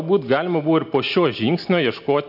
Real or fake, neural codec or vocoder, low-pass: real; none; 5.4 kHz